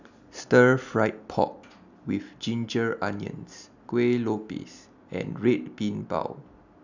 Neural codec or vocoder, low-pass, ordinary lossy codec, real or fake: none; 7.2 kHz; none; real